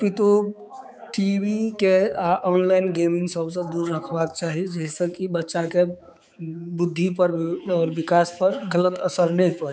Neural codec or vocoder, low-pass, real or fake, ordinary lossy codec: codec, 16 kHz, 4 kbps, X-Codec, HuBERT features, trained on balanced general audio; none; fake; none